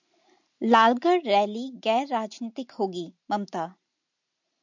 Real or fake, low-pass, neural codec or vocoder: real; 7.2 kHz; none